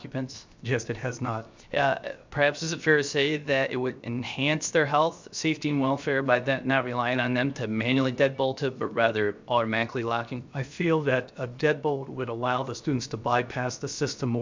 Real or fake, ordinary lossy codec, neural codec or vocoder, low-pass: fake; MP3, 64 kbps; codec, 16 kHz, 0.7 kbps, FocalCodec; 7.2 kHz